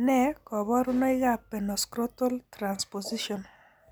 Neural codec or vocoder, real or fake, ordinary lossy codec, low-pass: none; real; none; none